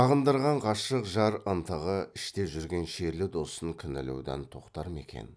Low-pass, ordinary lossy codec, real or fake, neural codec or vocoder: none; none; real; none